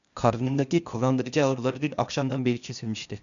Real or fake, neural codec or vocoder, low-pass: fake; codec, 16 kHz, 0.8 kbps, ZipCodec; 7.2 kHz